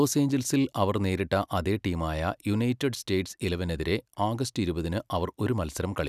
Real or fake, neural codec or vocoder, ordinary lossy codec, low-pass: real; none; none; 14.4 kHz